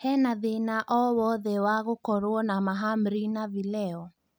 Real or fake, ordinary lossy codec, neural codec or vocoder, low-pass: real; none; none; none